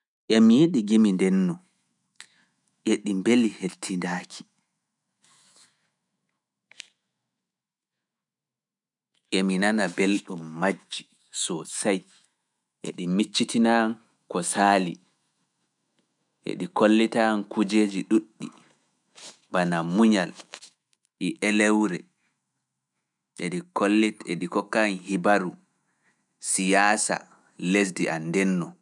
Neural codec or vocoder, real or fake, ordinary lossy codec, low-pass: autoencoder, 48 kHz, 128 numbers a frame, DAC-VAE, trained on Japanese speech; fake; none; 10.8 kHz